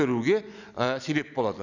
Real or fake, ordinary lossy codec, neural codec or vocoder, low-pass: real; none; none; 7.2 kHz